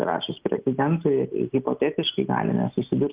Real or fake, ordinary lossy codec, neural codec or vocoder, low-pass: real; Opus, 32 kbps; none; 3.6 kHz